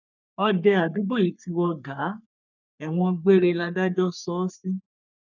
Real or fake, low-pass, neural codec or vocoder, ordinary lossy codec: fake; 7.2 kHz; codec, 44.1 kHz, 3.4 kbps, Pupu-Codec; none